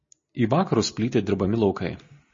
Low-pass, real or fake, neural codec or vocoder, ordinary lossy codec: 7.2 kHz; real; none; MP3, 32 kbps